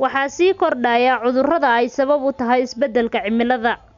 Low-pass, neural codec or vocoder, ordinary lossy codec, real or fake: 7.2 kHz; none; none; real